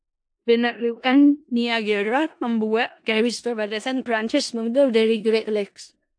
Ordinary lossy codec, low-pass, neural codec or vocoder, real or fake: AAC, 64 kbps; 9.9 kHz; codec, 16 kHz in and 24 kHz out, 0.4 kbps, LongCat-Audio-Codec, four codebook decoder; fake